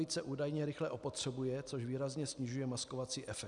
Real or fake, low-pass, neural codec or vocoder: real; 10.8 kHz; none